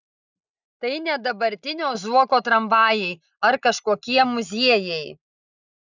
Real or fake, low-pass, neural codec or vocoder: real; 7.2 kHz; none